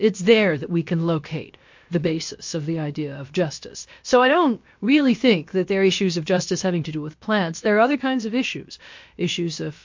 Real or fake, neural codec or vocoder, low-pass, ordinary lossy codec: fake; codec, 16 kHz, about 1 kbps, DyCAST, with the encoder's durations; 7.2 kHz; MP3, 48 kbps